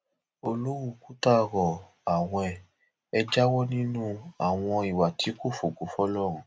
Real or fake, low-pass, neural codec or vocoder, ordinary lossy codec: real; none; none; none